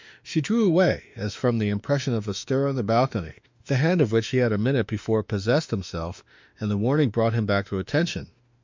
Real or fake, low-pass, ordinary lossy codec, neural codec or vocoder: fake; 7.2 kHz; MP3, 64 kbps; autoencoder, 48 kHz, 32 numbers a frame, DAC-VAE, trained on Japanese speech